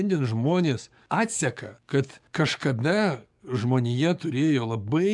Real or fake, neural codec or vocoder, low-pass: fake; codec, 44.1 kHz, 7.8 kbps, DAC; 10.8 kHz